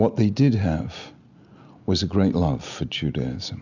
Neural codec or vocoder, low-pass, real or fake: none; 7.2 kHz; real